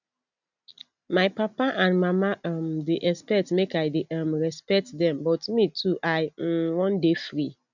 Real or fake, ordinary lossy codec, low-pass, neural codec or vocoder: real; none; 7.2 kHz; none